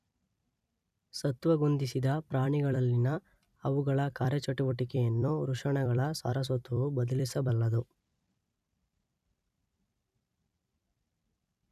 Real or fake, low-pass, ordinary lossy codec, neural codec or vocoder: fake; 14.4 kHz; none; vocoder, 44.1 kHz, 128 mel bands every 256 samples, BigVGAN v2